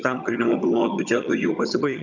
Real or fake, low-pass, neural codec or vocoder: fake; 7.2 kHz; vocoder, 22.05 kHz, 80 mel bands, HiFi-GAN